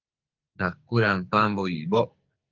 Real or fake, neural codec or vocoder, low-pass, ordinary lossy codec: fake; codec, 44.1 kHz, 2.6 kbps, SNAC; 7.2 kHz; Opus, 32 kbps